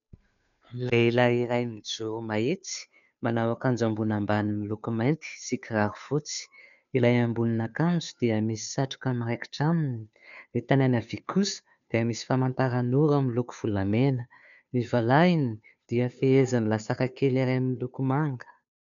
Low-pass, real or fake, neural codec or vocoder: 7.2 kHz; fake; codec, 16 kHz, 2 kbps, FunCodec, trained on Chinese and English, 25 frames a second